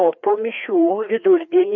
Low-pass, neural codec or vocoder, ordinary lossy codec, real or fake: 7.2 kHz; codec, 16 kHz, 2 kbps, FreqCodec, larger model; MP3, 48 kbps; fake